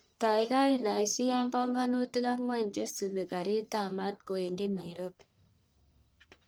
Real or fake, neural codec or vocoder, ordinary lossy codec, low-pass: fake; codec, 44.1 kHz, 1.7 kbps, Pupu-Codec; none; none